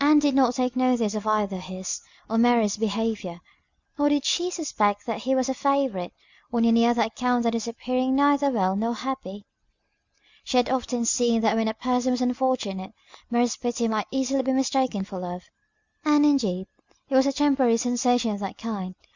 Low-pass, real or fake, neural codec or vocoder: 7.2 kHz; real; none